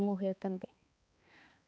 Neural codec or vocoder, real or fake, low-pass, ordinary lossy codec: codec, 16 kHz, 2 kbps, X-Codec, HuBERT features, trained on balanced general audio; fake; none; none